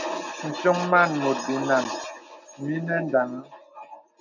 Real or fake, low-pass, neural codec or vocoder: real; 7.2 kHz; none